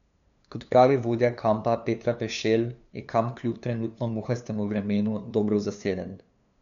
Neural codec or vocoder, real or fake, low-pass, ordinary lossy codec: codec, 16 kHz, 2 kbps, FunCodec, trained on LibriTTS, 25 frames a second; fake; 7.2 kHz; none